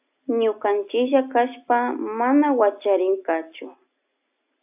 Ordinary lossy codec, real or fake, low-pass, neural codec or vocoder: AAC, 32 kbps; real; 3.6 kHz; none